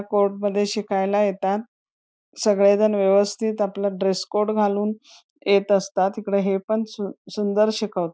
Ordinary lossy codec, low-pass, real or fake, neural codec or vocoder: none; none; real; none